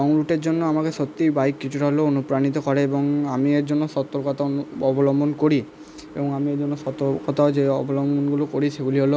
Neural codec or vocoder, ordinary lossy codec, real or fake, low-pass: none; none; real; none